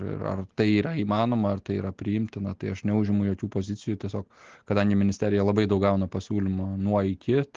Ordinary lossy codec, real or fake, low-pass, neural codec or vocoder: Opus, 16 kbps; real; 7.2 kHz; none